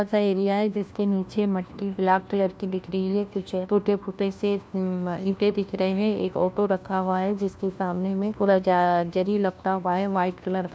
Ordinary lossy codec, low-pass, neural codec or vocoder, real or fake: none; none; codec, 16 kHz, 1 kbps, FunCodec, trained on LibriTTS, 50 frames a second; fake